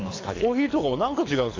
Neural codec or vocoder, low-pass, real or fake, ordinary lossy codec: codec, 24 kHz, 6 kbps, HILCodec; 7.2 kHz; fake; AAC, 32 kbps